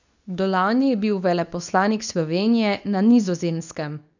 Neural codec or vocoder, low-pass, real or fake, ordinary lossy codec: codec, 24 kHz, 0.9 kbps, WavTokenizer, medium speech release version 2; 7.2 kHz; fake; none